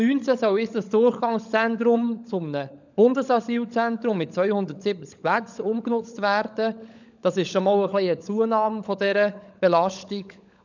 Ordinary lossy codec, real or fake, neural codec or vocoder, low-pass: none; fake; codec, 16 kHz, 16 kbps, FunCodec, trained on LibriTTS, 50 frames a second; 7.2 kHz